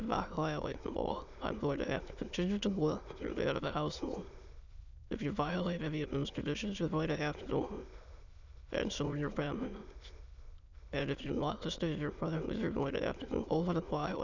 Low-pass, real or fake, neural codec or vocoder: 7.2 kHz; fake; autoencoder, 22.05 kHz, a latent of 192 numbers a frame, VITS, trained on many speakers